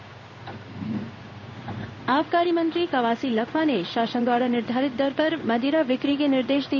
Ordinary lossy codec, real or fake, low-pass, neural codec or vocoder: none; fake; 7.2 kHz; codec, 16 kHz in and 24 kHz out, 1 kbps, XY-Tokenizer